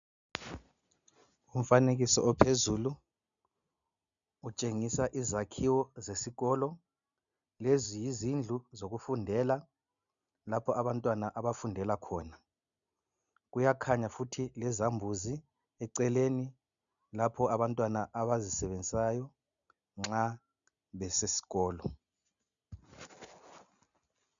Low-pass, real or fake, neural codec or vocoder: 7.2 kHz; real; none